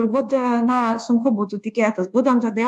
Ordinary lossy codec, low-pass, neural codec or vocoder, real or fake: AAC, 64 kbps; 10.8 kHz; codec, 24 kHz, 1.2 kbps, DualCodec; fake